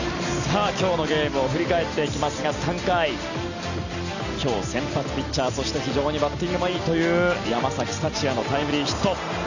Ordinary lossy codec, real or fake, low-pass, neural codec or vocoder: none; real; 7.2 kHz; none